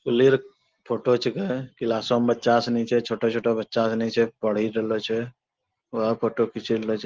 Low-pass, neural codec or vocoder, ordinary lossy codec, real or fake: 7.2 kHz; none; Opus, 16 kbps; real